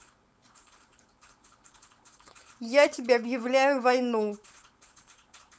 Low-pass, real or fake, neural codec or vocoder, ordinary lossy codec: none; fake; codec, 16 kHz, 4.8 kbps, FACodec; none